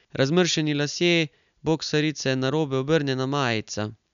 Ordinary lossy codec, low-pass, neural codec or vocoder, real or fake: none; 7.2 kHz; none; real